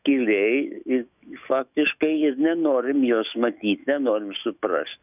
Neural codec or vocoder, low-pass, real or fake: autoencoder, 48 kHz, 128 numbers a frame, DAC-VAE, trained on Japanese speech; 3.6 kHz; fake